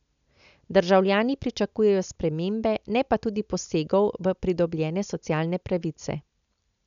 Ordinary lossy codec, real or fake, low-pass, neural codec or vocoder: none; real; 7.2 kHz; none